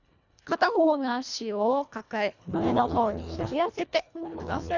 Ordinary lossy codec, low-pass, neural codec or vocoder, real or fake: none; 7.2 kHz; codec, 24 kHz, 1.5 kbps, HILCodec; fake